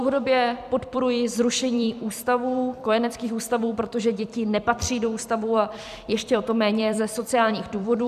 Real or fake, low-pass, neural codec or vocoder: fake; 14.4 kHz; vocoder, 44.1 kHz, 128 mel bands every 256 samples, BigVGAN v2